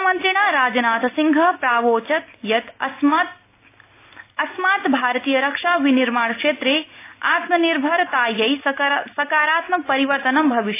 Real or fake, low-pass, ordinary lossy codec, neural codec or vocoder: real; 3.6 kHz; AAC, 24 kbps; none